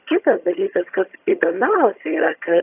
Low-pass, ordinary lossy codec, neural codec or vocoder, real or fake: 3.6 kHz; AAC, 32 kbps; vocoder, 22.05 kHz, 80 mel bands, HiFi-GAN; fake